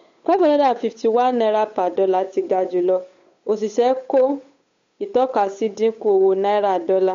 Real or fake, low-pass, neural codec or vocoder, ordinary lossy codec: fake; 7.2 kHz; codec, 16 kHz, 8 kbps, FunCodec, trained on Chinese and English, 25 frames a second; MP3, 48 kbps